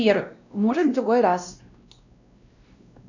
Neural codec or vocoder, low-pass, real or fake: codec, 16 kHz, 1 kbps, X-Codec, WavLM features, trained on Multilingual LibriSpeech; 7.2 kHz; fake